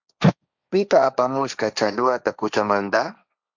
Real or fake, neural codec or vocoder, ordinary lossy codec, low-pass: fake; codec, 16 kHz, 1.1 kbps, Voila-Tokenizer; Opus, 64 kbps; 7.2 kHz